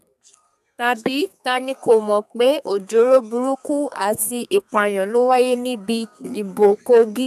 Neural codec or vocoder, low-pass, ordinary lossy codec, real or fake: codec, 32 kHz, 1.9 kbps, SNAC; 14.4 kHz; none; fake